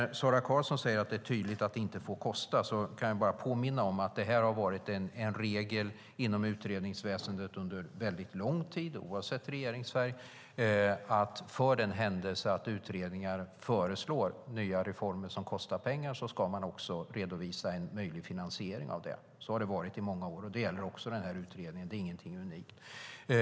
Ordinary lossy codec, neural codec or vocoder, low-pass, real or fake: none; none; none; real